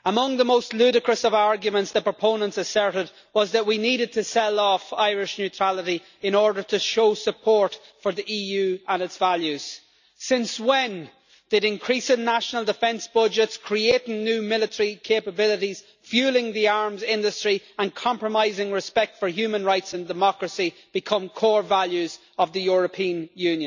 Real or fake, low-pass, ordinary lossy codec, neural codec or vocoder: real; 7.2 kHz; none; none